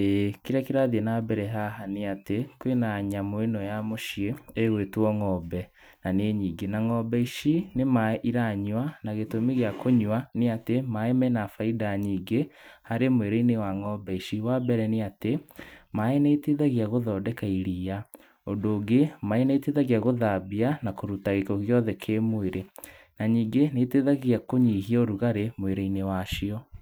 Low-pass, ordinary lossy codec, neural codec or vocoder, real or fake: none; none; vocoder, 44.1 kHz, 128 mel bands every 256 samples, BigVGAN v2; fake